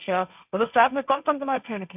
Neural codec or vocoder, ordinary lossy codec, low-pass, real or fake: codec, 16 kHz, 1.1 kbps, Voila-Tokenizer; none; 3.6 kHz; fake